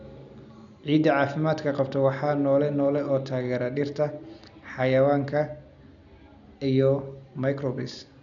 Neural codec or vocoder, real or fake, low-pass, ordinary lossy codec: none; real; 7.2 kHz; MP3, 96 kbps